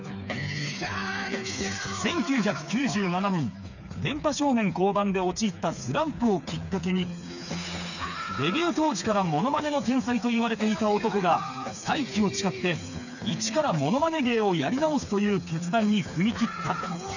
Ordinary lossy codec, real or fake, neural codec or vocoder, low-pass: none; fake; codec, 16 kHz, 4 kbps, FreqCodec, smaller model; 7.2 kHz